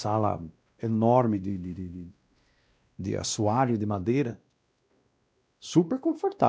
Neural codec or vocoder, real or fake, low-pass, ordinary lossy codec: codec, 16 kHz, 1 kbps, X-Codec, WavLM features, trained on Multilingual LibriSpeech; fake; none; none